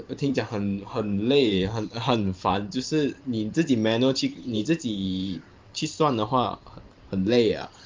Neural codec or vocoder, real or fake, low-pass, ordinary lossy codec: vocoder, 44.1 kHz, 128 mel bands every 512 samples, BigVGAN v2; fake; 7.2 kHz; Opus, 32 kbps